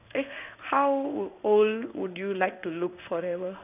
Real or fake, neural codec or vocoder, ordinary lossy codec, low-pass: fake; codec, 16 kHz in and 24 kHz out, 1 kbps, XY-Tokenizer; none; 3.6 kHz